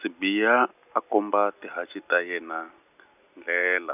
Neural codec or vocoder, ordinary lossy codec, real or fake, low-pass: none; none; real; 3.6 kHz